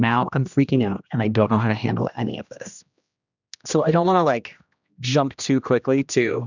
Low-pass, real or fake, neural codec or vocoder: 7.2 kHz; fake; codec, 16 kHz, 1 kbps, X-Codec, HuBERT features, trained on general audio